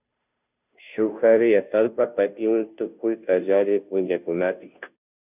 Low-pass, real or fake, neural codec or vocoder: 3.6 kHz; fake; codec, 16 kHz, 0.5 kbps, FunCodec, trained on Chinese and English, 25 frames a second